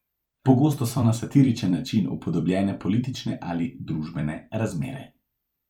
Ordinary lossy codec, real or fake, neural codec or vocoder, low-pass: none; fake; vocoder, 44.1 kHz, 128 mel bands every 256 samples, BigVGAN v2; 19.8 kHz